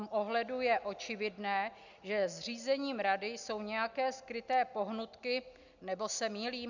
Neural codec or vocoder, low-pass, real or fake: none; 7.2 kHz; real